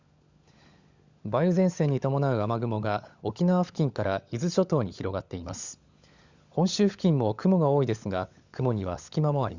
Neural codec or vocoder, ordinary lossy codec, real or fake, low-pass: codec, 16 kHz, 16 kbps, FunCodec, trained on LibriTTS, 50 frames a second; Opus, 64 kbps; fake; 7.2 kHz